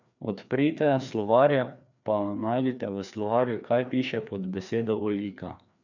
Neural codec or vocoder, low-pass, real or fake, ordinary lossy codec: codec, 16 kHz, 2 kbps, FreqCodec, larger model; 7.2 kHz; fake; none